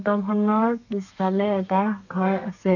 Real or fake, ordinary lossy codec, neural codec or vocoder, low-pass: fake; none; codec, 32 kHz, 1.9 kbps, SNAC; 7.2 kHz